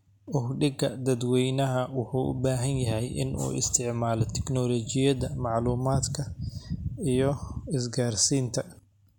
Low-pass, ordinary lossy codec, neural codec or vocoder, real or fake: 19.8 kHz; none; none; real